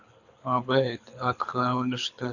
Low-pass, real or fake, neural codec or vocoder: 7.2 kHz; fake; codec, 24 kHz, 6 kbps, HILCodec